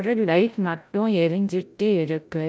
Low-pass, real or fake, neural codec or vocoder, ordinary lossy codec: none; fake; codec, 16 kHz, 0.5 kbps, FreqCodec, larger model; none